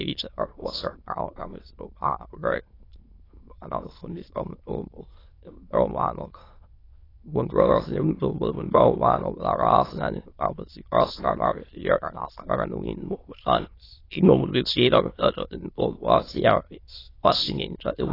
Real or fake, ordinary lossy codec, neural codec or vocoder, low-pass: fake; AAC, 24 kbps; autoencoder, 22.05 kHz, a latent of 192 numbers a frame, VITS, trained on many speakers; 5.4 kHz